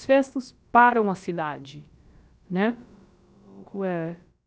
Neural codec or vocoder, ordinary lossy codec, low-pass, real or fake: codec, 16 kHz, about 1 kbps, DyCAST, with the encoder's durations; none; none; fake